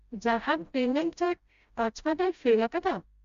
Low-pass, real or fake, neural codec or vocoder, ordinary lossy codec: 7.2 kHz; fake; codec, 16 kHz, 0.5 kbps, FreqCodec, smaller model; Opus, 64 kbps